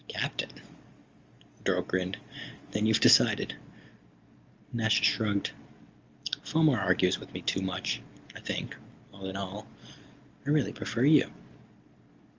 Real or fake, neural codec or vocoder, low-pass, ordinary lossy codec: real; none; 7.2 kHz; Opus, 32 kbps